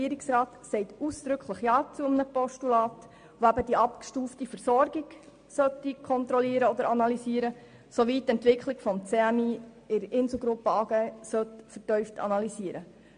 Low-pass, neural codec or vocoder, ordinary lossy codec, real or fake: 9.9 kHz; none; MP3, 48 kbps; real